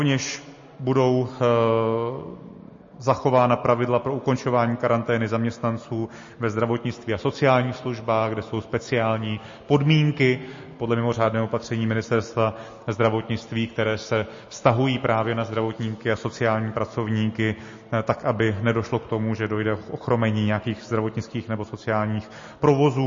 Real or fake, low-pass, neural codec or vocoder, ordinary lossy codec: real; 7.2 kHz; none; MP3, 32 kbps